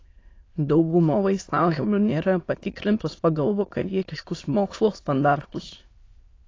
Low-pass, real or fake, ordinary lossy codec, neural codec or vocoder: 7.2 kHz; fake; AAC, 32 kbps; autoencoder, 22.05 kHz, a latent of 192 numbers a frame, VITS, trained on many speakers